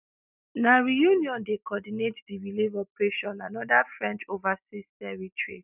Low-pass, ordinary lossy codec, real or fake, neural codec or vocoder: 3.6 kHz; none; real; none